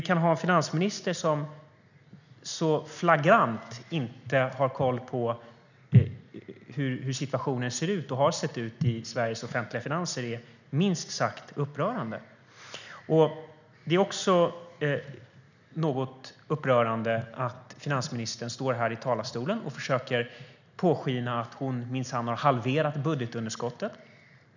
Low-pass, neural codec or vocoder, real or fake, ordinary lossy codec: 7.2 kHz; none; real; none